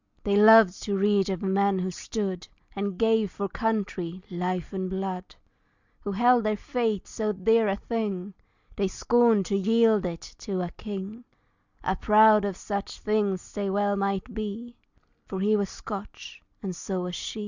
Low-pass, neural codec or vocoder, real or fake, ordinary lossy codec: 7.2 kHz; none; real; Opus, 64 kbps